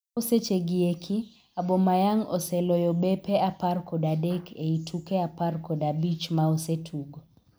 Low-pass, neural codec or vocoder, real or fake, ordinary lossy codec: none; none; real; none